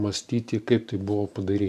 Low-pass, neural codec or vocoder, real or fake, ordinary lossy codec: 14.4 kHz; vocoder, 44.1 kHz, 128 mel bands every 512 samples, BigVGAN v2; fake; AAC, 96 kbps